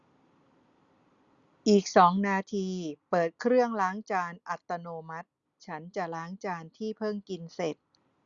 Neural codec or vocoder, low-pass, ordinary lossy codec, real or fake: none; 7.2 kHz; Opus, 64 kbps; real